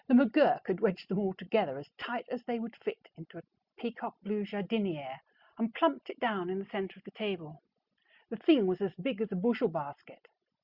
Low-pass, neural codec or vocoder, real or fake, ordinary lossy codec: 5.4 kHz; none; real; Opus, 64 kbps